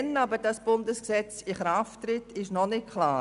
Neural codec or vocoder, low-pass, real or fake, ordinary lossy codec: none; 10.8 kHz; real; none